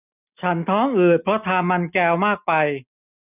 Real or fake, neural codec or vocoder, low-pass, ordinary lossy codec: real; none; 3.6 kHz; none